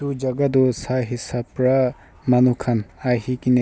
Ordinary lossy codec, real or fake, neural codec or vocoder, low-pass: none; real; none; none